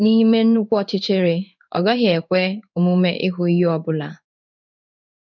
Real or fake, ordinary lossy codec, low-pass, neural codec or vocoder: fake; none; 7.2 kHz; codec, 16 kHz in and 24 kHz out, 1 kbps, XY-Tokenizer